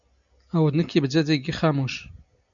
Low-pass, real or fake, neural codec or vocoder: 7.2 kHz; real; none